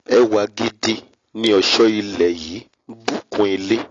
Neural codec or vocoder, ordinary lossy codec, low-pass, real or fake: none; AAC, 32 kbps; 7.2 kHz; real